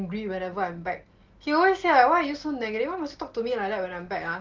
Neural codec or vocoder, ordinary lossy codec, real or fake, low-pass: none; Opus, 16 kbps; real; 7.2 kHz